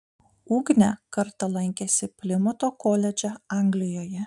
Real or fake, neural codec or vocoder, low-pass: real; none; 10.8 kHz